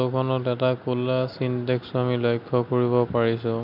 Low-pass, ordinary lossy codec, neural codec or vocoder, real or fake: 5.4 kHz; none; none; real